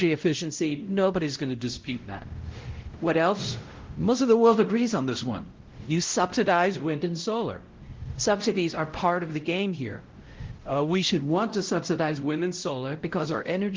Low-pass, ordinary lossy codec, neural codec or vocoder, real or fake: 7.2 kHz; Opus, 16 kbps; codec, 16 kHz, 0.5 kbps, X-Codec, WavLM features, trained on Multilingual LibriSpeech; fake